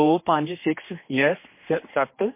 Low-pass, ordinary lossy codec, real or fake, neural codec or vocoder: 3.6 kHz; MP3, 24 kbps; fake; codec, 16 kHz, 2 kbps, X-Codec, HuBERT features, trained on general audio